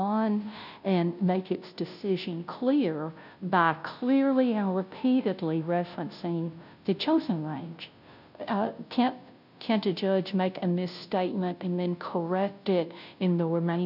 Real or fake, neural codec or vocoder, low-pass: fake; codec, 16 kHz, 0.5 kbps, FunCodec, trained on Chinese and English, 25 frames a second; 5.4 kHz